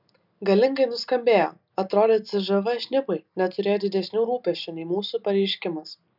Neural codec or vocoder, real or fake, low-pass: none; real; 5.4 kHz